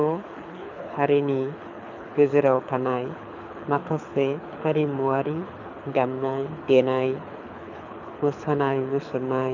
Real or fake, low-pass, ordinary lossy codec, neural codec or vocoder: fake; 7.2 kHz; none; codec, 24 kHz, 6 kbps, HILCodec